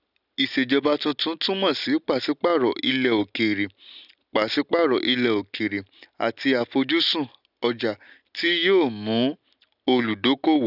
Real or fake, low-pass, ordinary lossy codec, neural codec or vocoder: real; 5.4 kHz; none; none